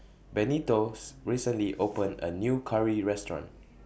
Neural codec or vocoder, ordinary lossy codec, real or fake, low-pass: none; none; real; none